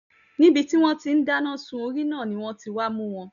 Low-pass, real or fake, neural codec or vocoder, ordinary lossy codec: 7.2 kHz; real; none; none